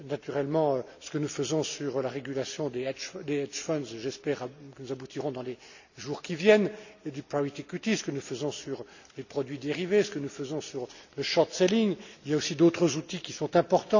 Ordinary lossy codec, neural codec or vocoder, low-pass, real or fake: none; none; 7.2 kHz; real